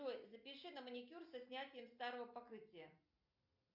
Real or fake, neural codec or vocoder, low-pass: real; none; 5.4 kHz